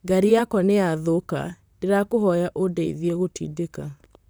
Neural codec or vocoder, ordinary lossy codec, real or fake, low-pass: vocoder, 44.1 kHz, 128 mel bands, Pupu-Vocoder; none; fake; none